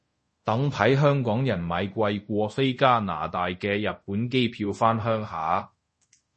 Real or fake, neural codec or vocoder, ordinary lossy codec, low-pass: fake; codec, 24 kHz, 0.5 kbps, DualCodec; MP3, 32 kbps; 10.8 kHz